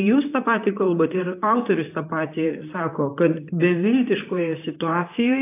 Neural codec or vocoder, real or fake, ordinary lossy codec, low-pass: vocoder, 44.1 kHz, 128 mel bands, Pupu-Vocoder; fake; AAC, 24 kbps; 3.6 kHz